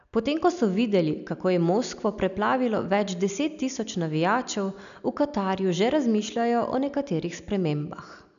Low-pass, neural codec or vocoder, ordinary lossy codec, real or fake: 7.2 kHz; none; none; real